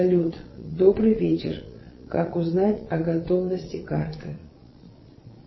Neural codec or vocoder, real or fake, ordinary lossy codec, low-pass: vocoder, 22.05 kHz, 80 mel bands, Vocos; fake; MP3, 24 kbps; 7.2 kHz